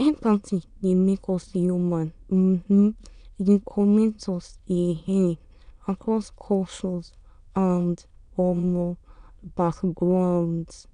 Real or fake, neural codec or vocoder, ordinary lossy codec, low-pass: fake; autoencoder, 22.05 kHz, a latent of 192 numbers a frame, VITS, trained on many speakers; none; 9.9 kHz